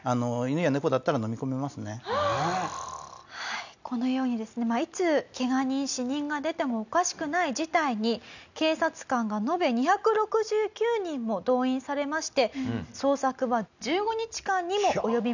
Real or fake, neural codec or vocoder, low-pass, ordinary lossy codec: real; none; 7.2 kHz; none